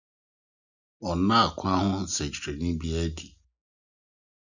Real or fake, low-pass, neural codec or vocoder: real; 7.2 kHz; none